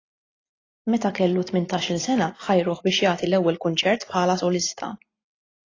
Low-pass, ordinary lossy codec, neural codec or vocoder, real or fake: 7.2 kHz; AAC, 32 kbps; none; real